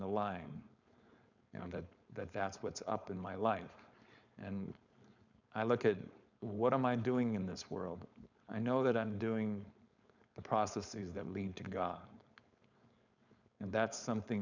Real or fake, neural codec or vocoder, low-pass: fake; codec, 16 kHz, 4.8 kbps, FACodec; 7.2 kHz